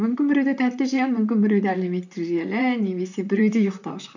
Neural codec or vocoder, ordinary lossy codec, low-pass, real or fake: vocoder, 44.1 kHz, 128 mel bands, Pupu-Vocoder; none; 7.2 kHz; fake